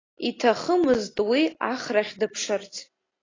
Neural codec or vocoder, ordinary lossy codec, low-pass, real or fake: none; AAC, 32 kbps; 7.2 kHz; real